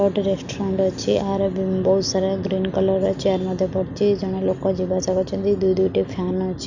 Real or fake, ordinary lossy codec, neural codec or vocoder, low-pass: real; none; none; 7.2 kHz